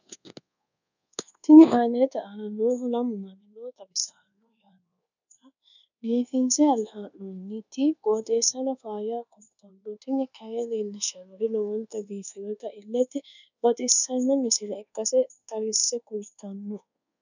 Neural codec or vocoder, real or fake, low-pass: codec, 24 kHz, 1.2 kbps, DualCodec; fake; 7.2 kHz